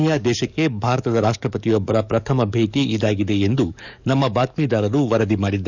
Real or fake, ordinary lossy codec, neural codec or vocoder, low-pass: fake; none; codec, 44.1 kHz, 7.8 kbps, DAC; 7.2 kHz